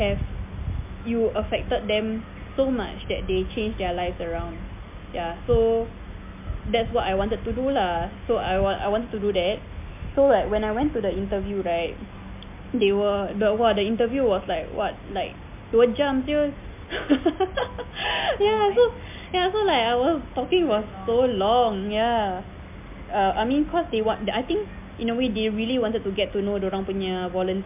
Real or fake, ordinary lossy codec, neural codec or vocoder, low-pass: real; MP3, 32 kbps; none; 3.6 kHz